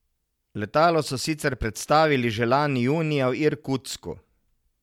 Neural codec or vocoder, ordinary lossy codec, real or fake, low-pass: none; MP3, 96 kbps; real; 19.8 kHz